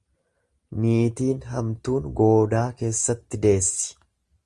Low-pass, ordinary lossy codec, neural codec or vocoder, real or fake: 10.8 kHz; Opus, 32 kbps; none; real